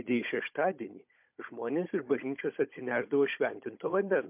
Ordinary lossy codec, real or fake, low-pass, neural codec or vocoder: MP3, 32 kbps; fake; 3.6 kHz; codec, 16 kHz, 16 kbps, FunCodec, trained on Chinese and English, 50 frames a second